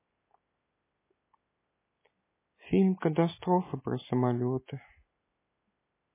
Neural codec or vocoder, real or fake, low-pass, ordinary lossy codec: none; real; 3.6 kHz; MP3, 16 kbps